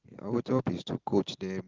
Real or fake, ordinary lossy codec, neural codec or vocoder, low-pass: real; Opus, 16 kbps; none; 7.2 kHz